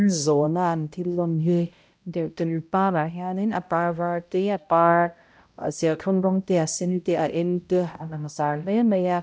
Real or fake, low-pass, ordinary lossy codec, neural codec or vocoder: fake; none; none; codec, 16 kHz, 0.5 kbps, X-Codec, HuBERT features, trained on balanced general audio